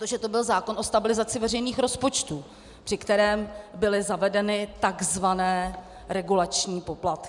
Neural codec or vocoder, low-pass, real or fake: none; 10.8 kHz; real